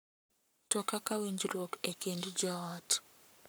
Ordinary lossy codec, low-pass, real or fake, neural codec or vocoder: none; none; fake; codec, 44.1 kHz, 7.8 kbps, Pupu-Codec